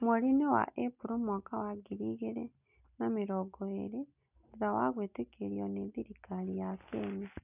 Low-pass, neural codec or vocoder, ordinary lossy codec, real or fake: 3.6 kHz; none; none; real